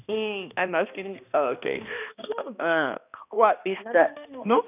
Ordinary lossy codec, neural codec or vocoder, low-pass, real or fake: none; codec, 16 kHz, 1 kbps, X-Codec, HuBERT features, trained on balanced general audio; 3.6 kHz; fake